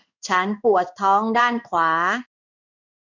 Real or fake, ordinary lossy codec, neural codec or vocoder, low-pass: fake; none; codec, 16 kHz in and 24 kHz out, 1 kbps, XY-Tokenizer; 7.2 kHz